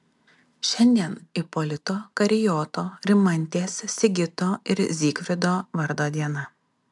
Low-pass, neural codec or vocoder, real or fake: 10.8 kHz; none; real